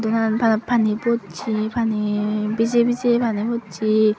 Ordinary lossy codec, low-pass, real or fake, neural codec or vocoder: none; none; real; none